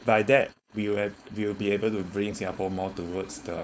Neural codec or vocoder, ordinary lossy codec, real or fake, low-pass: codec, 16 kHz, 4.8 kbps, FACodec; none; fake; none